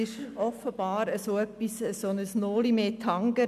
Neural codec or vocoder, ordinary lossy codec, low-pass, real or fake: none; none; 14.4 kHz; real